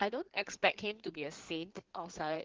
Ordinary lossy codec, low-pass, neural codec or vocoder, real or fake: Opus, 16 kbps; 7.2 kHz; codec, 16 kHz in and 24 kHz out, 2.2 kbps, FireRedTTS-2 codec; fake